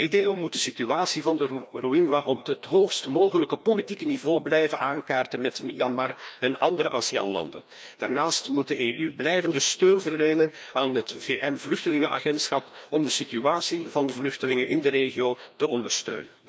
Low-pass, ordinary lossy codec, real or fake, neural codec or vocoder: none; none; fake; codec, 16 kHz, 1 kbps, FreqCodec, larger model